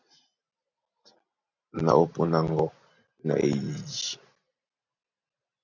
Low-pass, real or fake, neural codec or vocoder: 7.2 kHz; real; none